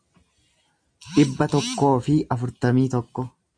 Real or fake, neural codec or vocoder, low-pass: real; none; 9.9 kHz